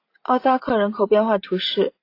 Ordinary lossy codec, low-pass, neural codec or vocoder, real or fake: AAC, 32 kbps; 5.4 kHz; none; real